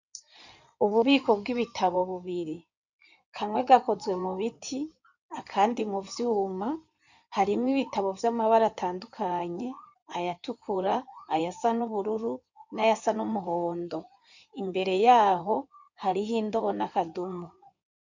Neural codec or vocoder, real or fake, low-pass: codec, 16 kHz in and 24 kHz out, 2.2 kbps, FireRedTTS-2 codec; fake; 7.2 kHz